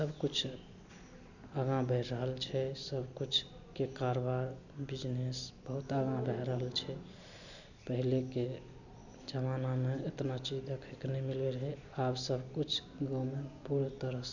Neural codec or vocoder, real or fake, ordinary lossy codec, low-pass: none; real; none; 7.2 kHz